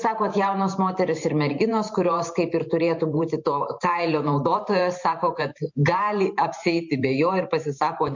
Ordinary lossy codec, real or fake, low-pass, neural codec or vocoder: MP3, 48 kbps; fake; 7.2 kHz; vocoder, 44.1 kHz, 128 mel bands every 512 samples, BigVGAN v2